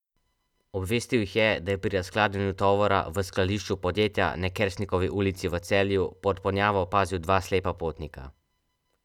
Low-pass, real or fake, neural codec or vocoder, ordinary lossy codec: 19.8 kHz; real; none; none